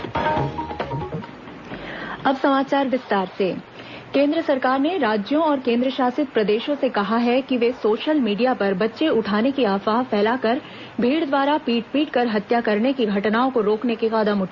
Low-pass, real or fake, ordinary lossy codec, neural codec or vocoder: 7.2 kHz; real; Opus, 64 kbps; none